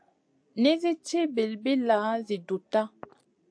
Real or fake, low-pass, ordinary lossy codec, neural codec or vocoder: real; 9.9 kHz; AAC, 64 kbps; none